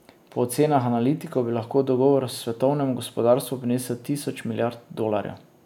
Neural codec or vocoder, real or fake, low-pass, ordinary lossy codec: none; real; 19.8 kHz; none